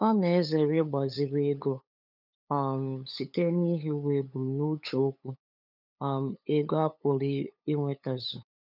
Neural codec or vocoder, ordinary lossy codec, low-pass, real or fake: codec, 16 kHz, 8 kbps, FunCodec, trained on LibriTTS, 25 frames a second; none; 5.4 kHz; fake